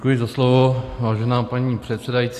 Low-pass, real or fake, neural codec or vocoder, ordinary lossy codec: 14.4 kHz; real; none; AAC, 64 kbps